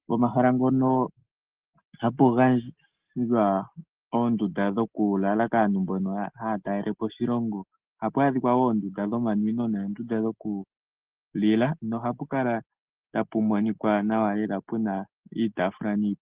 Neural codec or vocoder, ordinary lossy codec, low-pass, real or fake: none; Opus, 16 kbps; 3.6 kHz; real